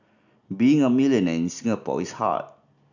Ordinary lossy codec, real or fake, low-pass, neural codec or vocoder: none; real; 7.2 kHz; none